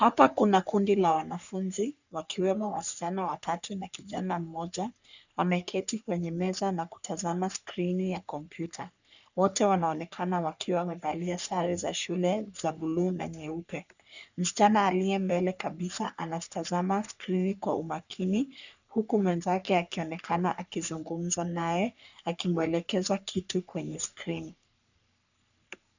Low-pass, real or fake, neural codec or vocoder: 7.2 kHz; fake; codec, 44.1 kHz, 3.4 kbps, Pupu-Codec